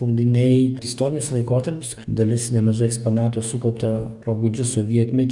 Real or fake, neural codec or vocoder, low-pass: fake; codec, 44.1 kHz, 2.6 kbps, DAC; 10.8 kHz